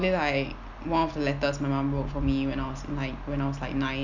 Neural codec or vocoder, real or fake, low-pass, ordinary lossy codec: none; real; 7.2 kHz; none